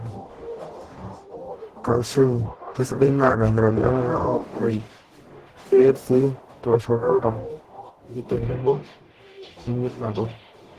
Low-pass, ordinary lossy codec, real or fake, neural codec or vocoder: 14.4 kHz; Opus, 16 kbps; fake; codec, 44.1 kHz, 0.9 kbps, DAC